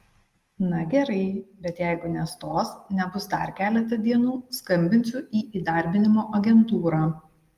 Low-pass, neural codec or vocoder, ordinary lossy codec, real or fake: 14.4 kHz; none; Opus, 32 kbps; real